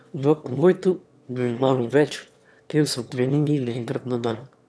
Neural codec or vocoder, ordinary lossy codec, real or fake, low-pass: autoencoder, 22.05 kHz, a latent of 192 numbers a frame, VITS, trained on one speaker; none; fake; none